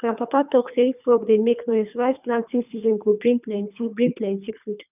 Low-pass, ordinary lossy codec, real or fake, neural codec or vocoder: 3.6 kHz; none; fake; codec, 24 kHz, 3 kbps, HILCodec